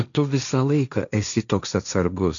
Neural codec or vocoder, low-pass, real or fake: codec, 16 kHz, 1.1 kbps, Voila-Tokenizer; 7.2 kHz; fake